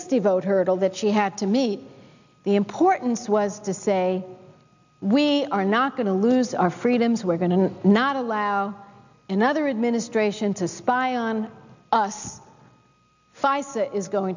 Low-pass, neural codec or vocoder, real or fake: 7.2 kHz; none; real